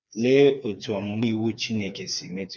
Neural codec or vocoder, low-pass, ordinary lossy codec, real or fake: codec, 16 kHz, 4 kbps, FreqCodec, smaller model; 7.2 kHz; none; fake